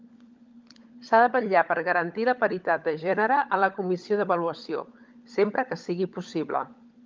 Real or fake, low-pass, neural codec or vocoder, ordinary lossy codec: fake; 7.2 kHz; codec, 16 kHz, 16 kbps, FunCodec, trained on LibriTTS, 50 frames a second; Opus, 24 kbps